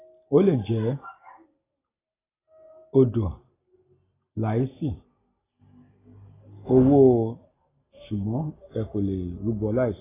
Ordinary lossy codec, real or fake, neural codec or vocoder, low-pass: AAC, 16 kbps; real; none; 3.6 kHz